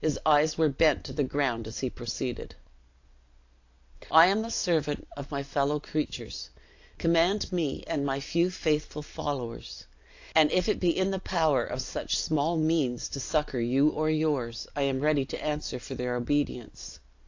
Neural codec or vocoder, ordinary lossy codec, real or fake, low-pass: none; AAC, 48 kbps; real; 7.2 kHz